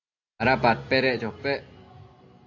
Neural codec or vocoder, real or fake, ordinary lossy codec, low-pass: none; real; AAC, 48 kbps; 7.2 kHz